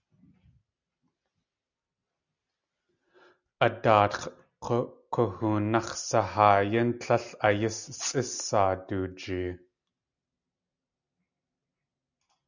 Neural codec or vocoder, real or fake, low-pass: none; real; 7.2 kHz